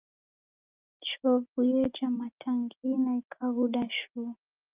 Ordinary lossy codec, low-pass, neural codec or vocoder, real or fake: Opus, 32 kbps; 3.6 kHz; none; real